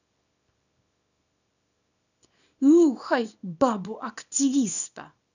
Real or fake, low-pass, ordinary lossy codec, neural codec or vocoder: fake; 7.2 kHz; none; codec, 24 kHz, 0.9 kbps, WavTokenizer, small release